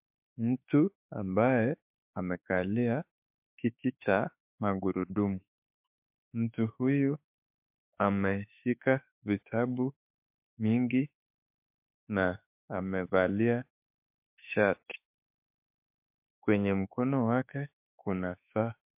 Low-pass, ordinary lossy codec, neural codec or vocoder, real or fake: 3.6 kHz; MP3, 32 kbps; autoencoder, 48 kHz, 32 numbers a frame, DAC-VAE, trained on Japanese speech; fake